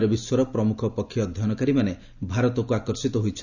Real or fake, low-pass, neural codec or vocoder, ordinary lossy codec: real; 7.2 kHz; none; none